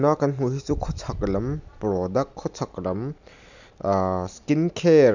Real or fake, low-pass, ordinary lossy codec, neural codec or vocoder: real; 7.2 kHz; none; none